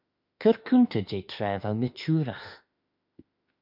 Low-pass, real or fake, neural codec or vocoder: 5.4 kHz; fake; autoencoder, 48 kHz, 32 numbers a frame, DAC-VAE, trained on Japanese speech